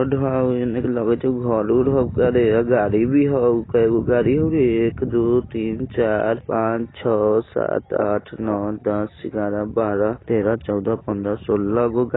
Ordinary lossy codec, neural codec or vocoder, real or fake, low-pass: AAC, 16 kbps; none; real; 7.2 kHz